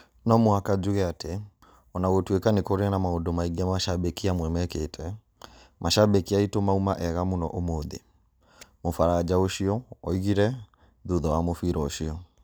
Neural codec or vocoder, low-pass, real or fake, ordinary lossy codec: none; none; real; none